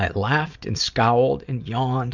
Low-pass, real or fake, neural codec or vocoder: 7.2 kHz; real; none